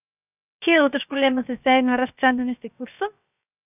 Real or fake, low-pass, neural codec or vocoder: fake; 3.6 kHz; codec, 16 kHz, 0.7 kbps, FocalCodec